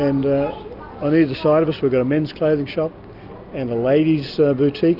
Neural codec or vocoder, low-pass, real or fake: none; 5.4 kHz; real